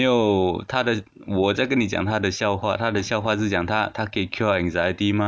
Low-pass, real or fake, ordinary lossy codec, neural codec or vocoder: none; real; none; none